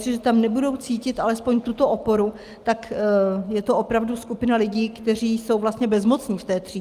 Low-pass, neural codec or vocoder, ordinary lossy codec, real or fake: 14.4 kHz; none; Opus, 32 kbps; real